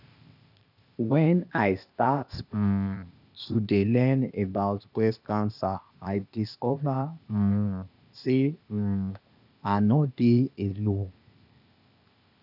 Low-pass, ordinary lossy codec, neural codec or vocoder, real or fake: 5.4 kHz; none; codec, 16 kHz, 0.8 kbps, ZipCodec; fake